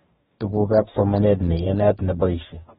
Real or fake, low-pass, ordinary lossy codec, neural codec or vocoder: fake; 19.8 kHz; AAC, 16 kbps; codec, 44.1 kHz, 2.6 kbps, DAC